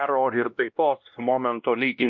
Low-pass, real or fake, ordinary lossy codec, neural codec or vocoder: 7.2 kHz; fake; MP3, 48 kbps; codec, 16 kHz, 1 kbps, X-Codec, WavLM features, trained on Multilingual LibriSpeech